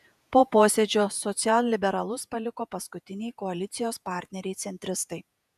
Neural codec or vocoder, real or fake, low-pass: vocoder, 48 kHz, 128 mel bands, Vocos; fake; 14.4 kHz